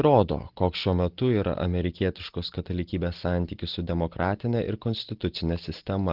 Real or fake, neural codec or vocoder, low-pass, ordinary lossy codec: real; none; 5.4 kHz; Opus, 16 kbps